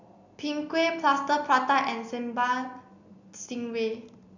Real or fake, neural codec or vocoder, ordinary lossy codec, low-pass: real; none; none; 7.2 kHz